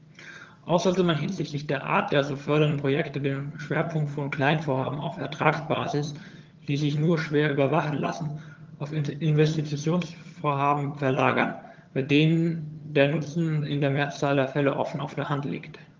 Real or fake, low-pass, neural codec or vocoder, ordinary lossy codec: fake; 7.2 kHz; vocoder, 22.05 kHz, 80 mel bands, HiFi-GAN; Opus, 32 kbps